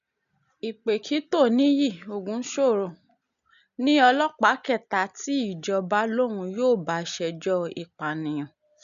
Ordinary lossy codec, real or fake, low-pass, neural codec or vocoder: none; real; 7.2 kHz; none